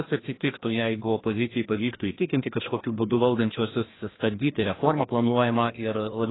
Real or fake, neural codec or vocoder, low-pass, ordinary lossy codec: fake; codec, 16 kHz, 1 kbps, FreqCodec, larger model; 7.2 kHz; AAC, 16 kbps